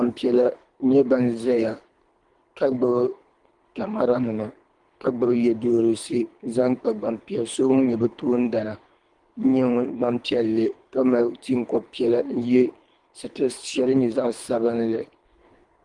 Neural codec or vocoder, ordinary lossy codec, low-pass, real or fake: codec, 24 kHz, 3 kbps, HILCodec; Opus, 24 kbps; 10.8 kHz; fake